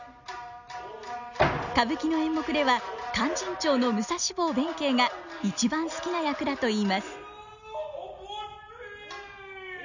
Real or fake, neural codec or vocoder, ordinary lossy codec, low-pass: real; none; none; 7.2 kHz